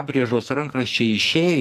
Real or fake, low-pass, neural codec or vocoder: fake; 14.4 kHz; codec, 44.1 kHz, 2.6 kbps, SNAC